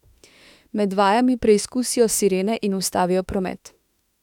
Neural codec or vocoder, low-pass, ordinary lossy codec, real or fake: autoencoder, 48 kHz, 32 numbers a frame, DAC-VAE, trained on Japanese speech; 19.8 kHz; none; fake